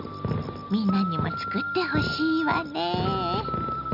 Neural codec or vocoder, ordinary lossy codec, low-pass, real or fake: none; none; 5.4 kHz; real